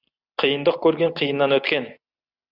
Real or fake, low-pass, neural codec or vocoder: real; 5.4 kHz; none